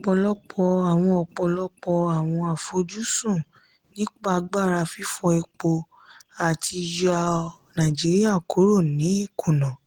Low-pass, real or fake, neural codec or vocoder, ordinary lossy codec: 19.8 kHz; real; none; Opus, 16 kbps